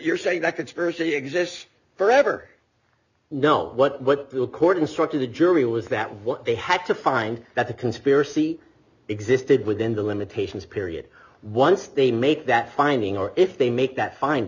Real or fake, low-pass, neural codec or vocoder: real; 7.2 kHz; none